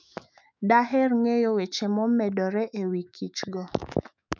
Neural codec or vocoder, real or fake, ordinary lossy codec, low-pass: autoencoder, 48 kHz, 128 numbers a frame, DAC-VAE, trained on Japanese speech; fake; none; 7.2 kHz